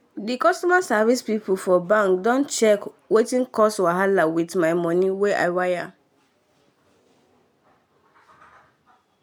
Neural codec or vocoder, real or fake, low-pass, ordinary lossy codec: none; real; none; none